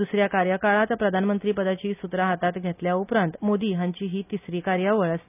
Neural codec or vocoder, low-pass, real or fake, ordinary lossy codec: none; 3.6 kHz; real; none